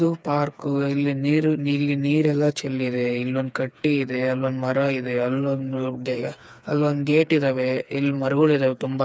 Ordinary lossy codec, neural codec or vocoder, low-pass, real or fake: none; codec, 16 kHz, 4 kbps, FreqCodec, smaller model; none; fake